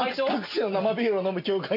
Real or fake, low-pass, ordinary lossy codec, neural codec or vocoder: real; 5.4 kHz; none; none